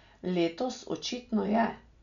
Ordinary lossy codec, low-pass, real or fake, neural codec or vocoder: none; 7.2 kHz; real; none